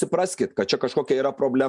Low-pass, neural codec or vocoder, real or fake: 10.8 kHz; none; real